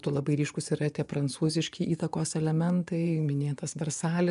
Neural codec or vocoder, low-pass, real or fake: vocoder, 24 kHz, 100 mel bands, Vocos; 10.8 kHz; fake